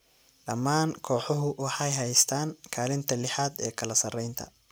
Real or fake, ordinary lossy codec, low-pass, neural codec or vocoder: real; none; none; none